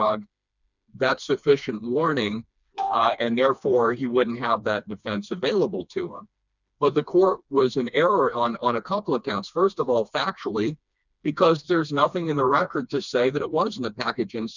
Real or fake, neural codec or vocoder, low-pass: fake; codec, 16 kHz, 2 kbps, FreqCodec, smaller model; 7.2 kHz